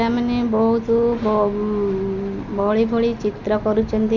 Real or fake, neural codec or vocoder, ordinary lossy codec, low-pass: real; none; none; 7.2 kHz